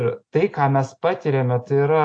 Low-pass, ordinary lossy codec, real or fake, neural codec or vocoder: 9.9 kHz; AAC, 48 kbps; real; none